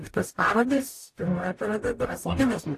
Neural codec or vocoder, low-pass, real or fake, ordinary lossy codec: codec, 44.1 kHz, 0.9 kbps, DAC; 14.4 kHz; fake; AAC, 64 kbps